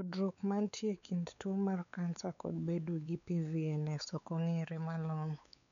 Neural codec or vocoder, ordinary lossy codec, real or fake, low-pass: codec, 16 kHz, 4 kbps, X-Codec, WavLM features, trained on Multilingual LibriSpeech; none; fake; 7.2 kHz